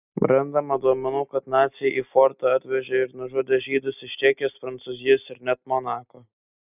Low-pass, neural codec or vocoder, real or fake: 3.6 kHz; none; real